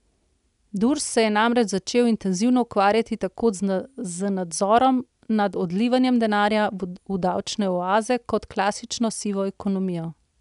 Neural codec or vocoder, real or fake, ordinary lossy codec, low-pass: none; real; none; 10.8 kHz